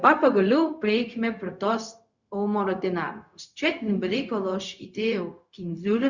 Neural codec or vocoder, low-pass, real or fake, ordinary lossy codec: codec, 16 kHz, 0.4 kbps, LongCat-Audio-Codec; 7.2 kHz; fake; Opus, 64 kbps